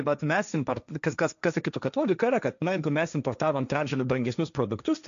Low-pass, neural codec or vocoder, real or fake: 7.2 kHz; codec, 16 kHz, 1.1 kbps, Voila-Tokenizer; fake